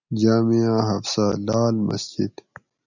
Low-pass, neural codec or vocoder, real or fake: 7.2 kHz; none; real